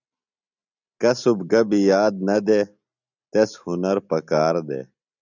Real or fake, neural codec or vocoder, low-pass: real; none; 7.2 kHz